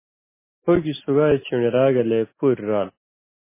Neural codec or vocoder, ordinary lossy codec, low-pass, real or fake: none; MP3, 16 kbps; 3.6 kHz; real